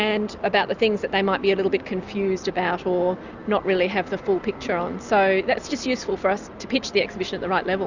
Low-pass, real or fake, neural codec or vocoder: 7.2 kHz; real; none